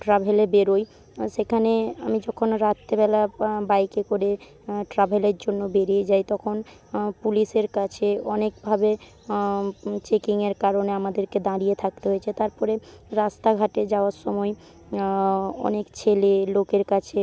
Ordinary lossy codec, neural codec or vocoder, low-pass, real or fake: none; none; none; real